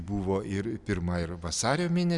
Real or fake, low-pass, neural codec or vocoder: real; 10.8 kHz; none